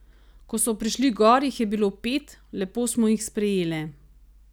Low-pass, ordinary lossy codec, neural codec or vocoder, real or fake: none; none; none; real